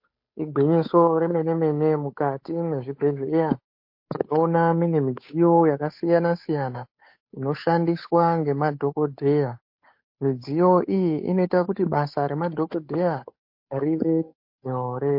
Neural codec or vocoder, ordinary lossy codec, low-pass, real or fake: codec, 16 kHz, 8 kbps, FunCodec, trained on Chinese and English, 25 frames a second; MP3, 32 kbps; 5.4 kHz; fake